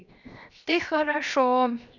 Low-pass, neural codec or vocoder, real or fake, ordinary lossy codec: 7.2 kHz; codec, 16 kHz, 0.7 kbps, FocalCodec; fake; none